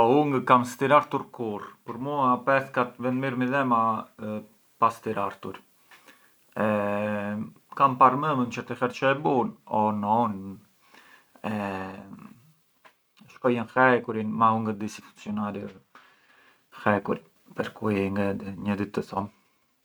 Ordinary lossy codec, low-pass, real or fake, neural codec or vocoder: none; none; real; none